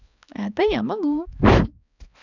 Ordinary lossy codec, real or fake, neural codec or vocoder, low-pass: none; fake; codec, 16 kHz, 2 kbps, X-Codec, HuBERT features, trained on balanced general audio; 7.2 kHz